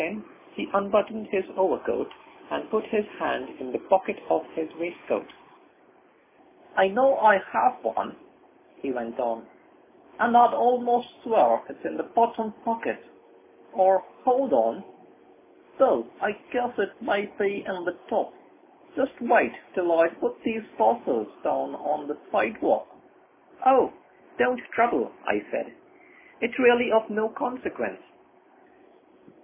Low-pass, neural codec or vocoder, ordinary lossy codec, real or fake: 3.6 kHz; none; MP3, 16 kbps; real